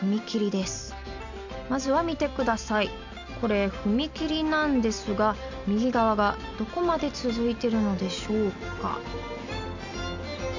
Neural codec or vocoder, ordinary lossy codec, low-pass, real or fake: none; none; 7.2 kHz; real